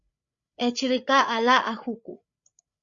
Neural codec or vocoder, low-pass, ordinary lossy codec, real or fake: codec, 16 kHz, 8 kbps, FreqCodec, larger model; 7.2 kHz; Opus, 64 kbps; fake